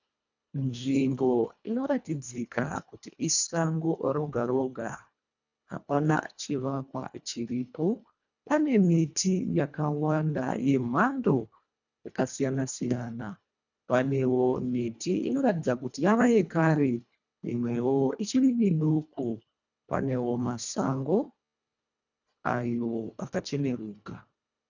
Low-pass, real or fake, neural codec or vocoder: 7.2 kHz; fake; codec, 24 kHz, 1.5 kbps, HILCodec